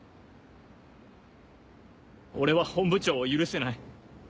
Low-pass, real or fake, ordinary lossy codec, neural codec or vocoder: none; real; none; none